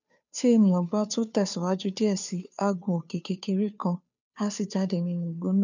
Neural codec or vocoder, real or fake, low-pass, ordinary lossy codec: codec, 16 kHz, 2 kbps, FunCodec, trained on Chinese and English, 25 frames a second; fake; 7.2 kHz; none